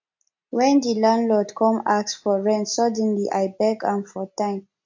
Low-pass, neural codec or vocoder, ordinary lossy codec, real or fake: 7.2 kHz; none; MP3, 48 kbps; real